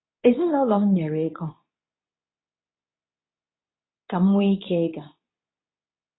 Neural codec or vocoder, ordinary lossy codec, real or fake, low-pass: codec, 24 kHz, 0.9 kbps, WavTokenizer, medium speech release version 2; AAC, 16 kbps; fake; 7.2 kHz